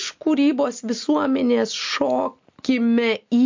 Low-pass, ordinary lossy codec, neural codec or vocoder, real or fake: 7.2 kHz; MP3, 48 kbps; none; real